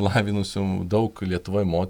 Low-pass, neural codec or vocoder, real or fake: 19.8 kHz; vocoder, 48 kHz, 128 mel bands, Vocos; fake